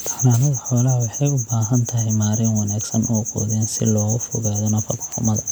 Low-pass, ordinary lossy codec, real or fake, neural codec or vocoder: none; none; real; none